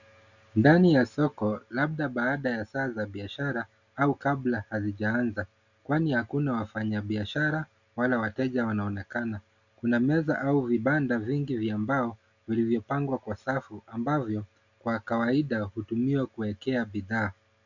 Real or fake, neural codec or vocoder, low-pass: real; none; 7.2 kHz